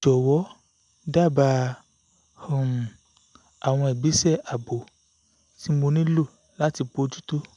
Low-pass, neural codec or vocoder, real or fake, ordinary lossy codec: 10.8 kHz; none; real; none